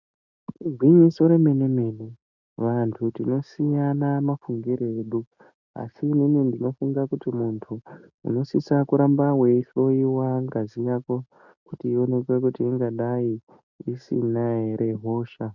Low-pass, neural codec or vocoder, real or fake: 7.2 kHz; none; real